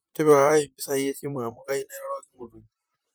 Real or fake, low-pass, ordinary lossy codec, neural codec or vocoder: fake; none; none; vocoder, 44.1 kHz, 128 mel bands, Pupu-Vocoder